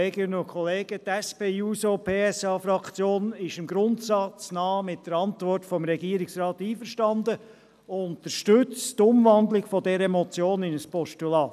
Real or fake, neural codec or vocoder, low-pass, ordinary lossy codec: real; none; 14.4 kHz; none